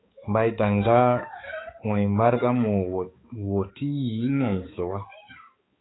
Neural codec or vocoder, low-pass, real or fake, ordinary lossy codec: codec, 16 kHz, 4 kbps, X-Codec, HuBERT features, trained on balanced general audio; 7.2 kHz; fake; AAC, 16 kbps